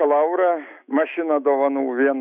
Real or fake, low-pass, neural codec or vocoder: fake; 3.6 kHz; autoencoder, 48 kHz, 128 numbers a frame, DAC-VAE, trained on Japanese speech